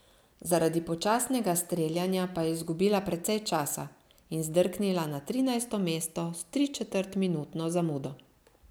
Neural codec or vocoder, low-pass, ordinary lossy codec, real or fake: none; none; none; real